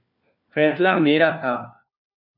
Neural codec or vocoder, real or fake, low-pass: codec, 16 kHz, 1 kbps, FunCodec, trained on LibriTTS, 50 frames a second; fake; 5.4 kHz